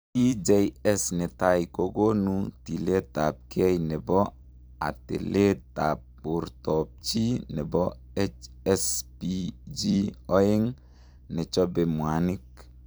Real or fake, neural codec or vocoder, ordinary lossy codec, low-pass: fake; vocoder, 44.1 kHz, 128 mel bands every 256 samples, BigVGAN v2; none; none